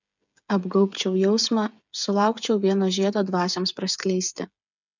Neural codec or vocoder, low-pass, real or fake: codec, 16 kHz, 8 kbps, FreqCodec, smaller model; 7.2 kHz; fake